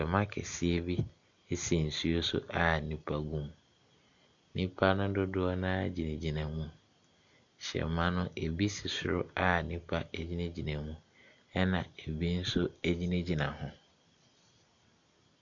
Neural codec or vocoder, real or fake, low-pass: none; real; 7.2 kHz